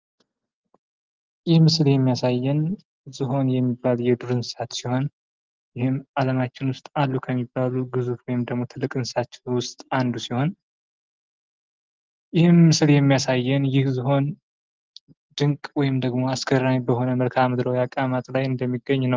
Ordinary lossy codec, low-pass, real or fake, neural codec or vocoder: Opus, 32 kbps; 7.2 kHz; real; none